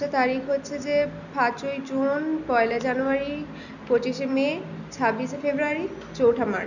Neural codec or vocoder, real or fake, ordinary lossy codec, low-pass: none; real; none; 7.2 kHz